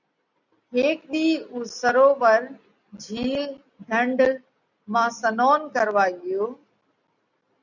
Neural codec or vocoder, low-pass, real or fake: none; 7.2 kHz; real